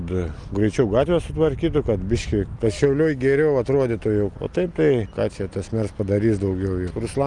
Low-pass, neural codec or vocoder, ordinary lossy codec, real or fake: 10.8 kHz; none; Opus, 32 kbps; real